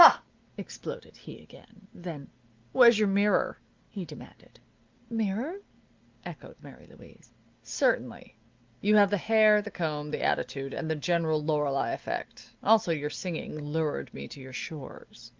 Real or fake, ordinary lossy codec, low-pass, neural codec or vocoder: real; Opus, 24 kbps; 7.2 kHz; none